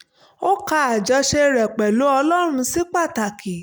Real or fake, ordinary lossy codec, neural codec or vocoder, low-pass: real; none; none; none